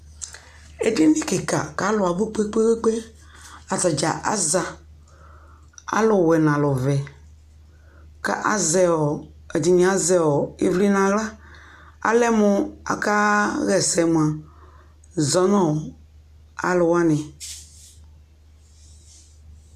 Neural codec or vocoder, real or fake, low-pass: none; real; 14.4 kHz